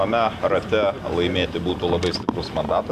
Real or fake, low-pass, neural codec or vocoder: real; 14.4 kHz; none